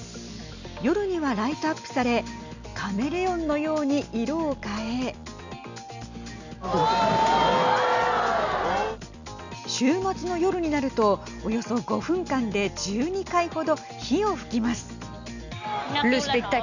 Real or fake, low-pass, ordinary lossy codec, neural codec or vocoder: real; 7.2 kHz; none; none